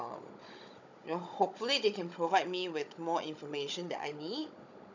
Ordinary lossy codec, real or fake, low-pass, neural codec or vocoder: none; fake; 7.2 kHz; codec, 16 kHz, 16 kbps, FunCodec, trained on Chinese and English, 50 frames a second